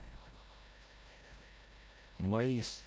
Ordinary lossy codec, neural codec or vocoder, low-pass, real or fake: none; codec, 16 kHz, 0.5 kbps, FreqCodec, larger model; none; fake